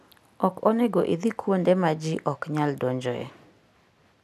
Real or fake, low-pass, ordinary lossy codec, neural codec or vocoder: real; 14.4 kHz; none; none